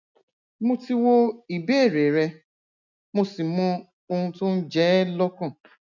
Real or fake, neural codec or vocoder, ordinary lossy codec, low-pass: real; none; none; 7.2 kHz